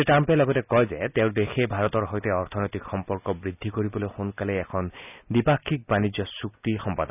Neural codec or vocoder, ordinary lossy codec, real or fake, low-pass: none; none; real; 3.6 kHz